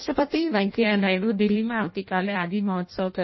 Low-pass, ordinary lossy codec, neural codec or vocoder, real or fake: 7.2 kHz; MP3, 24 kbps; codec, 16 kHz in and 24 kHz out, 0.6 kbps, FireRedTTS-2 codec; fake